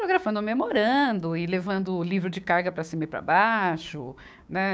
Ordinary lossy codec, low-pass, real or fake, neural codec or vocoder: none; none; fake; codec, 16 kHz, 6 kbps, DAC